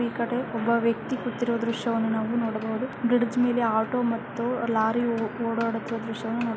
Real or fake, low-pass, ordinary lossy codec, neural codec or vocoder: real; none; none; none